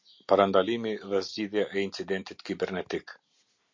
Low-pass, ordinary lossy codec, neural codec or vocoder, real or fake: 7.2 kHz; MP3, 48 kbps; none; real